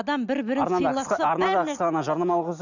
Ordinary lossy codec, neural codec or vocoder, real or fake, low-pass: none; none; real; 7.2 kHz